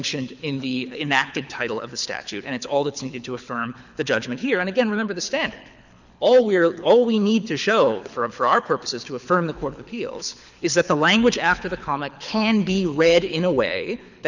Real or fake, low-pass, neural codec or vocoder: fake; 7.2 kHz; codec, 24 kHz, 6 kbps, HILCodec